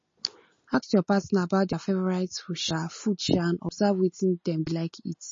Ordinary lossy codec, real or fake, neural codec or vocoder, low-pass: MP3, 32 kbps; real; none; 7.2 kHz